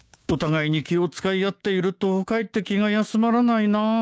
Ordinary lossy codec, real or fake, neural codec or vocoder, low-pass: none; fake; codec, 16 kHz, 6 kbps, DAC; none